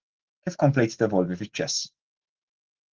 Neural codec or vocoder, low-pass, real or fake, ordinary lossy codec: none; 7.2 kHz; real; Opus, 16 kbps